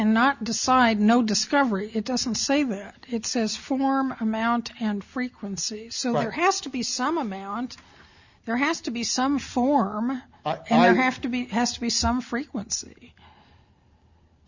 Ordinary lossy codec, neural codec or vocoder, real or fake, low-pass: Opus, 64 kbps; none; real; 7.2 kHz